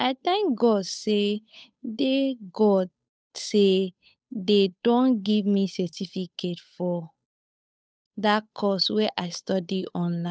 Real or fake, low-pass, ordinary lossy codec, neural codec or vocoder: fake; none; none; codec, 16 kHz, 8 kbps, FunCodec, trained on Chinese and English, 25 frames a second